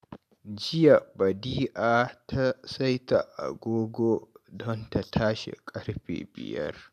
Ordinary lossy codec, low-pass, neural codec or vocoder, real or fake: none; 14.4 kHz; none; real